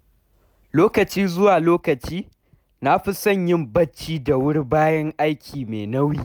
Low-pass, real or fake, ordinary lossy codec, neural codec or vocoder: none; real; none; none